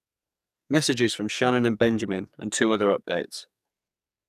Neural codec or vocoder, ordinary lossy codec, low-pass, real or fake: codec, 44.1 kHz, 2.6 kbps, SNAC; none; 14.4 kHz; fake